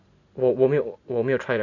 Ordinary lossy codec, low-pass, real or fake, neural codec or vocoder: none; 7.2 kHz; real; none